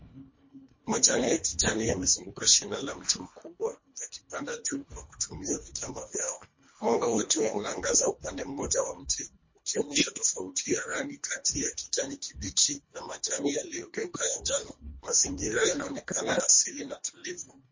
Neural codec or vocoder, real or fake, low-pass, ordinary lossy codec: codec, 24 kHz, 1.5 kbps, HILCodec; fake; 7.2 kHz; MP3, 32 kbps